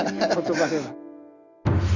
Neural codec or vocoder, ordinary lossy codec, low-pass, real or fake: none; none; 7.2 kHz; real